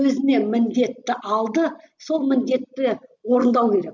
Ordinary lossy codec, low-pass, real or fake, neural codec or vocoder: none; 7.2 kHz; real; none